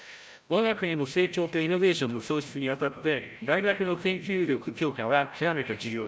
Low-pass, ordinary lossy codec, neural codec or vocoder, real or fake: none; none; codec, 16 kHz, 0.5 kbps, FreqCodec, larger model; fake